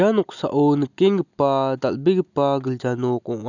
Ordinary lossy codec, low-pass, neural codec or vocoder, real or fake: none; 7.2 kHz; none; real